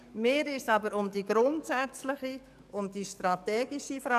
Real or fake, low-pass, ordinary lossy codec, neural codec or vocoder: fake; 14.4 kHz; none; codec, 44.1 kHz, 7.8 kbps, DAC